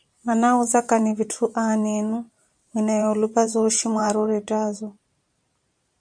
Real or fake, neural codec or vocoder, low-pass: fake; vocoder, 44.1 kHz, 128 mel bands every 512 samples, BigVGAN v2; 9.9 kHz